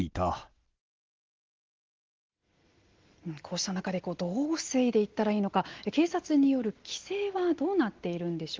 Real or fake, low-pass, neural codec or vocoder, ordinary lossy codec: real; 7.2 kHz; none; Opus, 16 kbps